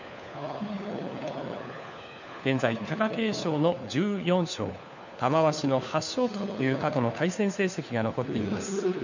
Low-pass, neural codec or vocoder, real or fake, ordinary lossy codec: 7.2 kHz; codec, 16 kHz, 4 kbps, FunCodec, trained on LibriTTS, 50 frames a second; fake; none